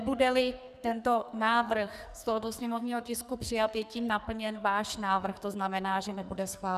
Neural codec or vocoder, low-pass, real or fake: codec, 44.1 kHz, 2.6 kbps, SNAC; 14.4 kHz; fake